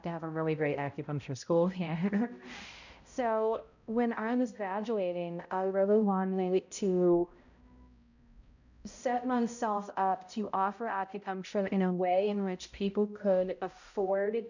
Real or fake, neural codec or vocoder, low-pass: fake; codec, 16 kHz, 0.5 kbps, X-Codec, HuBERT features, trained on balanced general audio; 7.2 kHz